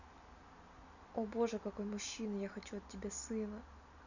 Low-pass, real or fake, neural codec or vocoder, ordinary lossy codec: 7.2 kHz; real; none; none